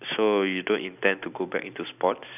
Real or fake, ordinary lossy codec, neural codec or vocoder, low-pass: real; none; none; 3.6 kHz